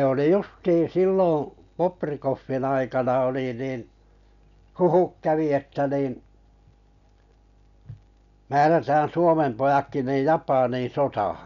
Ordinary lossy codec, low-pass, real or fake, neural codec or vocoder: none; 7.2 kHz; real; none